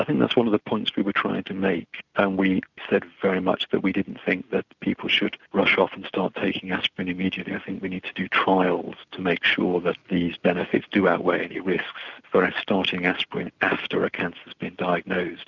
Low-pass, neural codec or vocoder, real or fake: 7.2 kHz; none; real